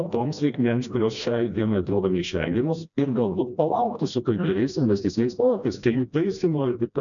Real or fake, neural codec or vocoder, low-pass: fake; codec, 16 kHz, 1 kbps, FreqCodec, smaller model; 7.2 kHz